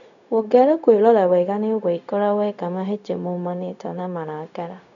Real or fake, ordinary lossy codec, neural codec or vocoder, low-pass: fake; none; codec, 16 kHz, 0.4 kbps, LongCat-Audio-Codec; 7.2 kHz